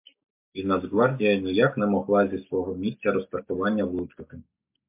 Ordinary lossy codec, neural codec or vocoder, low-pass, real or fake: MP3, 32 kbps; none; 3.6 kHz; real